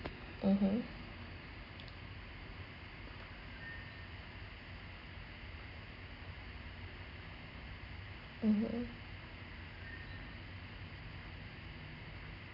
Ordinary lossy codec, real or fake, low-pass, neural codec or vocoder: none; real; 5.4 kHz; none